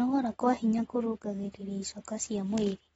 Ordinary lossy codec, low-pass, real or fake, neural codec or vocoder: AAC, 24 kbps; 19.8 kHz; real; none